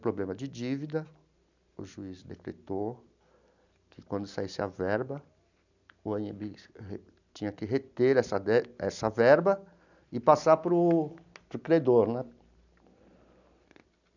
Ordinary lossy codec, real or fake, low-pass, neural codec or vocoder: none; real; 7.2 kHz; none